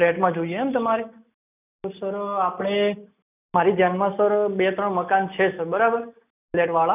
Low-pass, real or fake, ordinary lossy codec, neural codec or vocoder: 3.6 kHz; real; none; none